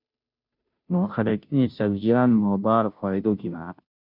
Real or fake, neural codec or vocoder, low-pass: fake; codec, 16 kHz, 0.5 kbps, FunCodec, trained on Chinese and English, 25 frames a second; 5.4 kHz